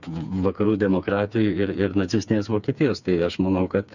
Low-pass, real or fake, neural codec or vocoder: 7.2 kHz; fake; codec, 16 kHz, 4 kbps, FreqCodec, smaller model